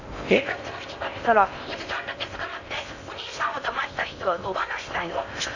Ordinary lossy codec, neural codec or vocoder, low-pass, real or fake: none; codec, 16 kHz in and 24 kHz out, 0.6 kbps, FocalCodec, streaming, 4096 codes; 7.2 kHz; fake